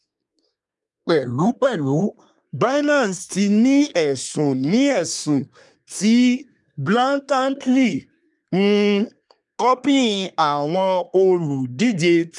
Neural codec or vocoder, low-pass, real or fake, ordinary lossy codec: codec, 24 kHz, 1 kbps, SNAC; 10.8 kHz; fake; none